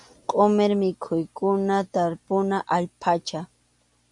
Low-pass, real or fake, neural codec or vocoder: 10.8 kHz; real; none